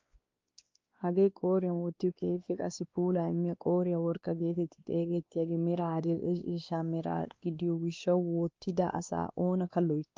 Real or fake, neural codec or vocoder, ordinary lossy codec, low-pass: fake; codec, 16 kHz, 2 kbps, X-Codec, WavLM features, trained on Multilingual LibriSpeech; Opus, 32 kbps; 7.2 kHz